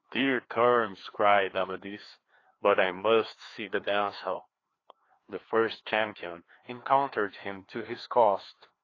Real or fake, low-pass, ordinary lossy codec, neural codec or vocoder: fake; 7.2 kHz; AAC, 32 kbps; codec, 16 kHz, 2 kbps, FreqCodec, larger model